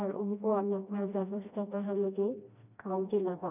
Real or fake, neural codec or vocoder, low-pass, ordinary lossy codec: fake; codec, 16 kHz, 1 kbps, FreqCodec, smaller model; 3.6 kHz; none